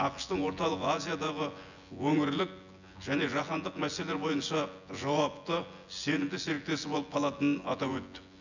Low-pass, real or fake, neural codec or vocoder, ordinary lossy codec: 7.2 kHz; fake; vocoder, 24 kHz, 100 mel bands, Vocos; none